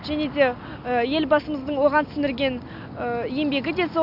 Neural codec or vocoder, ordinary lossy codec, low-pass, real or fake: none; none; 5.4 kHz; real